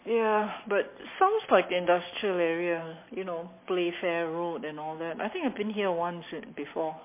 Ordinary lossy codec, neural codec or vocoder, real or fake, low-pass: MP3, 24 kbps; none; real; 3.6 kHz